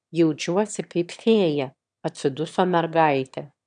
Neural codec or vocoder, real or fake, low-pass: autoencoder, 22.05 kHz, a latent of 192 numbers a frame, VITS, trained on one speaker; fake; 9.9 kHz